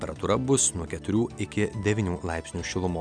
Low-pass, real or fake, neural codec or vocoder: 9.9 kHz; real; none